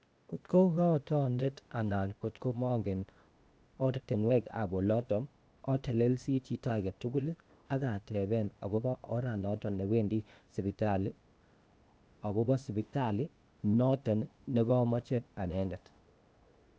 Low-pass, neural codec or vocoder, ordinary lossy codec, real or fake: none; codec, 16 kHz, 0.8 kbps, ZipCodec; none; fake